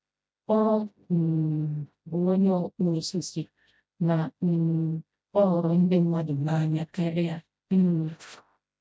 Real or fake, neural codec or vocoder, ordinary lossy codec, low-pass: fake; codec, 16 kHz, 0.5 kbps, FreqCodec, smaller model; none; none